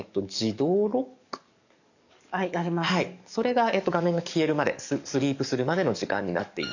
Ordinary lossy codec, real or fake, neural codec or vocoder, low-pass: none; fake; codec, 44.1 kHz, 7.8 kbps, DAC; 7.2 kHz